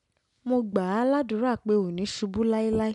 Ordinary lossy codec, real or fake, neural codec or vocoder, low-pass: none; real; none; 10.8 kHz